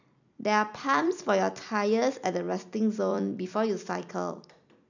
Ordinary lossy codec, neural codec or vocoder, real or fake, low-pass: none; vocoder, 44.1 kHz, 128 mel bands every 256 samples, BigVGAN v2; fake; 7.2 kHz